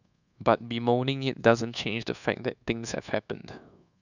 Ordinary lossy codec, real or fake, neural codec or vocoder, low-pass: none; fake; codec, 24 kHz, 1.2 kbps, DualCodec; 7.2 kHz